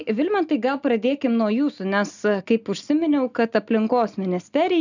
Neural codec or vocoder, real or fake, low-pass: none; real; 7.2 kHz